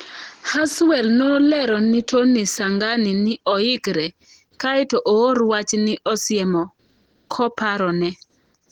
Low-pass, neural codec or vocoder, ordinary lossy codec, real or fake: 19.8 kHz; none; Opus, 16 kbps; real